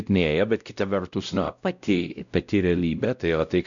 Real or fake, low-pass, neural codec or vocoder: fake; 7.2 kHz; codec, 16 kHz, 0.5 kbps, X-Codec, WavLM features, trained on Multilingual LibriSpeech